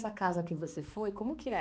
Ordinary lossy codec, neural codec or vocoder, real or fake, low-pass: none; codec, 16 kHz, 2 kbps, X-Codec, HuBERT features, trained on balanced general audio; fake; none